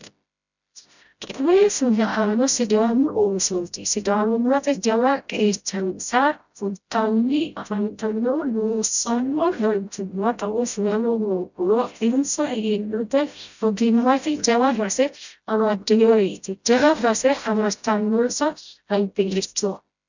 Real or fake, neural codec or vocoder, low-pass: fake; codec, 16 kHz, 0.5 kbps, FreqCodec, smaller model; 7.2 kHz